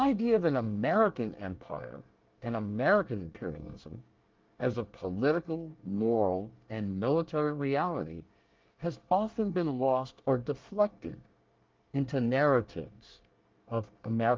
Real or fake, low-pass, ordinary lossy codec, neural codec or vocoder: fake; 7.2 kHz; Opus, 32 kbps; codec, 24 kHz, 1 kbps, SNAC